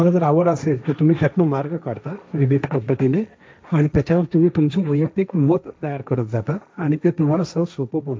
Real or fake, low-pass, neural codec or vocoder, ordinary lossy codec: fake; none; codec, 16 kHz, 1.1 kbps, Voila-Tokenizer; none